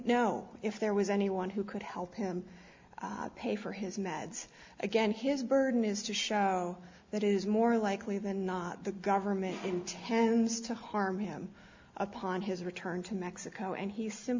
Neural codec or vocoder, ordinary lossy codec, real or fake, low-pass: none; MP3, 64 kbps; real; 7.2 kHz